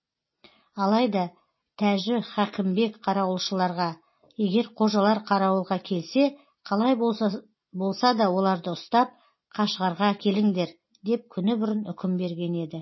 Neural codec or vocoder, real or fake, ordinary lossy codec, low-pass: none; real; MP3, 24 kbps; 7.2 kHz